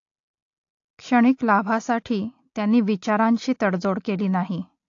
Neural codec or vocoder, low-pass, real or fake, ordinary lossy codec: none; 7.2 kHz; real; AAC, 48 kbps